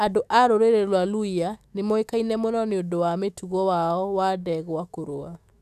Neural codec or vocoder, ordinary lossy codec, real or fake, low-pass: autoencoder, 48 kHz, 128 numbers a frame, DAC-VAE, trained on Japanese speech; none; fake; 14.4 kHz